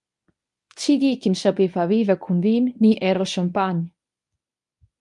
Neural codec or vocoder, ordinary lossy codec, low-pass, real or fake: codec, 24 kHz, 0.9 kbps, WavTokenizer, medium speech release version 1; MP3, 64 kbps; 10.8 kHz; fake